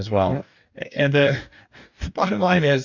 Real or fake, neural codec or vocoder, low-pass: fake; codec, 44.1 kHz, 2.6 kbps, DAC; 7.2 kHz